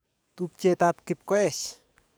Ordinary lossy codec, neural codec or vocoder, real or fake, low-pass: none; codec, 44.1 kHz, 7.8 kbps, DAC; fake; none